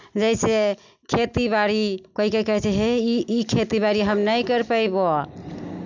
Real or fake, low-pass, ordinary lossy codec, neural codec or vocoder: real; 7.2 kHz; none; none